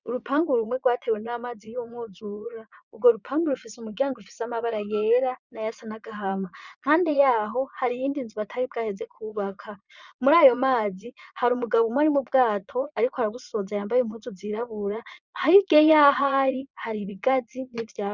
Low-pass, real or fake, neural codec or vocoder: 7.2 kHz; fake; vocoder, 22.05 kHz, 80 mel bands, Vocos